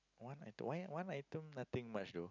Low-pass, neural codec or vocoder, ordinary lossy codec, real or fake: 7.2 kHz; none; none; real